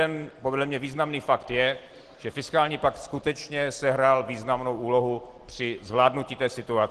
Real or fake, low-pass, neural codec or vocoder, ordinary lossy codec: real; 10.8 kHz; none; Opus, 16 kbps